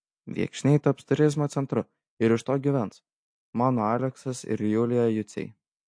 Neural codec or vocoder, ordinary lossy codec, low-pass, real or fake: none; MP3, 48 kbps; 9.9 kHz; real